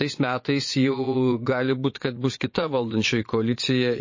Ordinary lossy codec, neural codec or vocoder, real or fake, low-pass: MP3, 32 kbps; vocoder, 22.05 kHz, 80 mel bands, Vocos; fake; 7.2 kHz